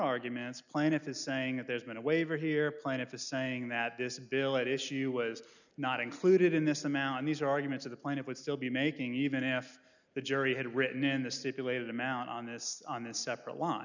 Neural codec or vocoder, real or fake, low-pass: none; real; 7.2 kHz